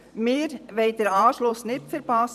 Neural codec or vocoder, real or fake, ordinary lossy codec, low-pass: vocoder, 44.1 kHz, 128 mel bands every 512 samples, BigVGAN v2; fake; none; 14.4 kHz